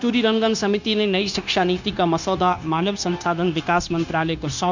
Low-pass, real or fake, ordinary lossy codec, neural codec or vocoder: 7.2 kHz; fake; none; codec, 16 kHz, 0.9 kbps, LongCat-Audio-Codec